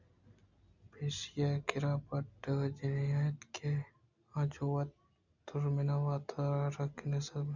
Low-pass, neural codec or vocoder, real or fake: 7.2 kHz; none; real